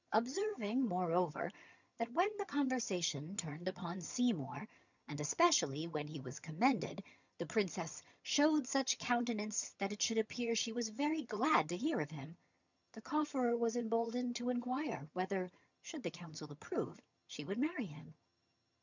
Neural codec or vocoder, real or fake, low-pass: vocoder, 22.05 kHz, 80 mel bands, HiFi-GAN; fake; 7.2 kHz